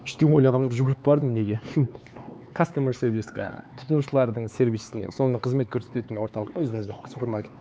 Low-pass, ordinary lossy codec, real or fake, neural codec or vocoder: none; none; fake; codec, 16 kHz, 4 kbps, X-Codec, HuBERT features, trained on LibriSpeech